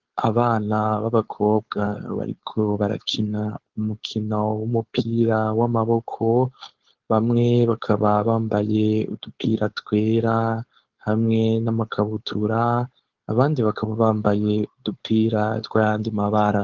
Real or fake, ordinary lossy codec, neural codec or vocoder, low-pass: fake; Opus, 16 kbps; codec, 16 kHz, 4.8 kbps, FACodec; 7.2 kHz